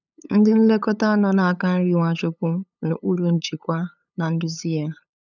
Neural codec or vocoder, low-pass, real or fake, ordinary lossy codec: codec, 16 kHz, 8 kbps, FunCodec, trained on LibriTTS, 25 frames a second; 7.2 kHz; fake; none